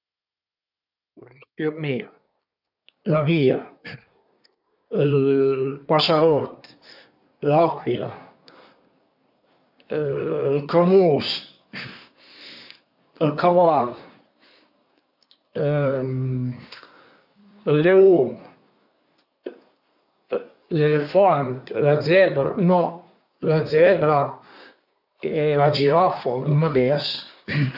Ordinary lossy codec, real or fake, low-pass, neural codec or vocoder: none; fake; 5.4 kHz; codec, 24 kHz, 1 kbps, SNAC